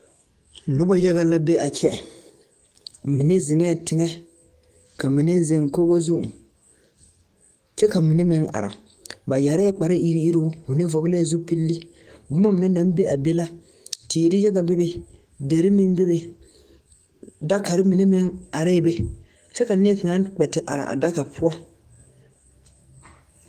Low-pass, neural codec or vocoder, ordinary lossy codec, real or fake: 14.4 kHz; codec, 44.1 kHz, 2.6 kbps, SNAC; Opus, 32 kbps; fake